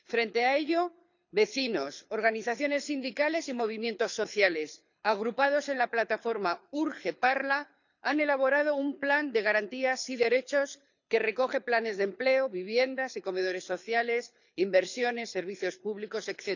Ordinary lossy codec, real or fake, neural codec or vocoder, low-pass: none; fake; codec, 24 kHz, 6 kbps, HILCodec; 7.2 kHz